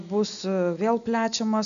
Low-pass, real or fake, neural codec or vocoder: 7.2 kHz; real; none